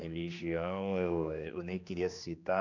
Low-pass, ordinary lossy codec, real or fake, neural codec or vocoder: 7.2 kHz; none; fake; codec, 16 kHz, 2 kbps, X-Codec, HuBERT features, trained on general audio